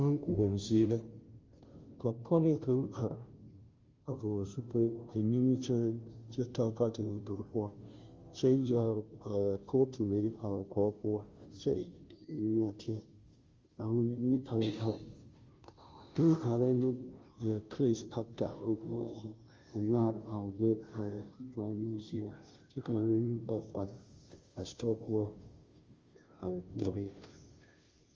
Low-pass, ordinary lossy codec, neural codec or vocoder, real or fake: 7.2 kHz; Opus, 32 kbps; codec, 16 kHz, 0.5 kbps, FunCodec, trained on Chinese and English, 25 frames a second; fake